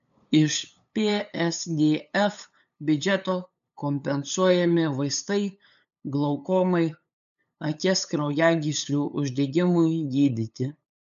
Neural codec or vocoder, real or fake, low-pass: codec, 16 kHz, 8 kbps, FunCodec, trained on LibriTTS, 25 frames a second; fake; 7.2 kHz